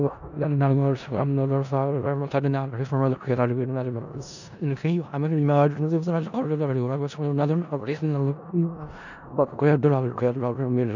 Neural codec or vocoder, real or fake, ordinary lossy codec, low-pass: codec, 16 kHz in and 24 kHz out, 0.4 kbps, LongCat-Audio-Codec, four codebook decoder; fake; none; 7.2 kHz